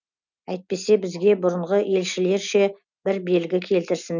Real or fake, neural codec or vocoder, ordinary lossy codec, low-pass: real; none; none; 7.2 kHz